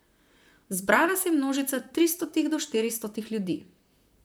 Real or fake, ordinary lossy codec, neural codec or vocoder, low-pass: fake; none; vocoder, 44.1 kHz, 128 mel bands, Pupu-Vocoder; none